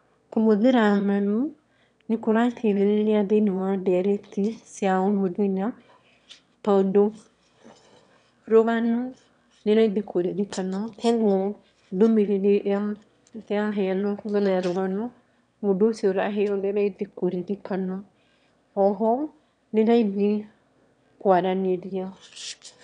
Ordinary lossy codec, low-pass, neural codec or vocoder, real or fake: none; 9.9 kHz; autoencoder, 22.05 kHz, a latent of 192 numbers a frame, VITS, trained on one speaker; fake